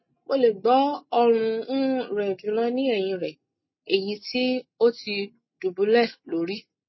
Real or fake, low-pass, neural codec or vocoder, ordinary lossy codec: real; 7.2 kHz; none; MP3, 24 kbps